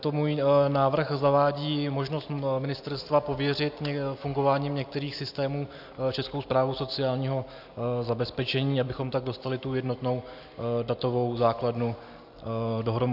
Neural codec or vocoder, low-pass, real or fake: none; 5.4 kHz; real